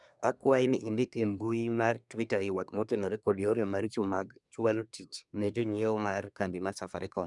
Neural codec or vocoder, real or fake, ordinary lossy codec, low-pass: codec, 24 kHz, 1 kbps, SNAC; fake; none; 10.8 kHz